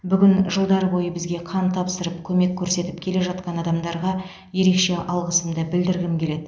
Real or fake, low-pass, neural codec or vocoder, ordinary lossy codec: real; none; none; none